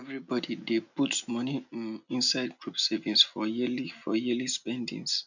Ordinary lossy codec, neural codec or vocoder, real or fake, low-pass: none; none; real; 7.2 kHz